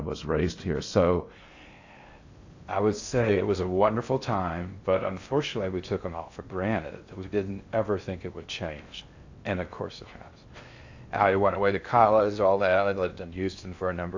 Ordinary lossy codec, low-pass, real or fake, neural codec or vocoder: AAC, 48 kbps; 7.2 kHz; fake; codec, 16 kHz in and 24 kHz out, 0.6 kbps, FocalCodec, streaming, 4096 codes